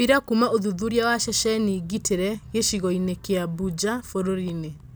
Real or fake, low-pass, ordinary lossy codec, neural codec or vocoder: real; none; none; none